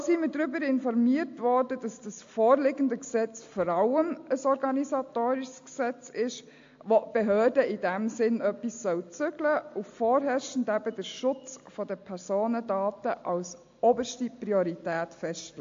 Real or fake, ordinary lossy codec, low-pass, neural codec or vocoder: real; AAC, 48 kbps; 7.2 kHz; none